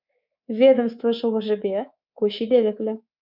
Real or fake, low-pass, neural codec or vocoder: fake; 5.4 kHz; codec, 24 kHz, 3.1 kbps, DualCodec